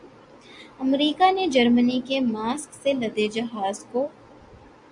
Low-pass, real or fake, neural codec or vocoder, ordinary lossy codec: 10.8 kHz; real; none; MP3, 64 kbps